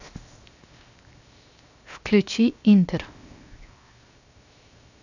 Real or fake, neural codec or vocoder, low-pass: fake; codec, 16 kHz, 0.7 kbps, FocalCodec; 7.2 kHz